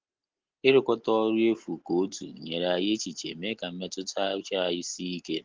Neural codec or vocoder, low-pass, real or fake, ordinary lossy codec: none; 7.2 kHz; real; Opus, 16 kbps